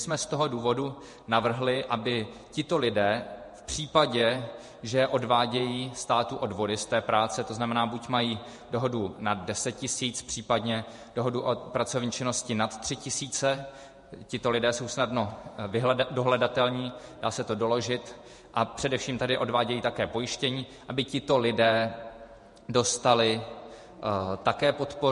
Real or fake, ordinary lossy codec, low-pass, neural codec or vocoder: fake; MP3, 48 kbps; 14.4 kHz; vocoder, 48 kHz, 128 mel bands, Vocos